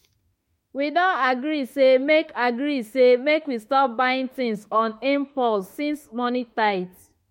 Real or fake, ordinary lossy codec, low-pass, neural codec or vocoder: fake; MP3, 64 kbps; 19.8 kHz; autoencoder, 48 kHz, 32 numbers a frame, DAC-VAE, trained on Japanese speech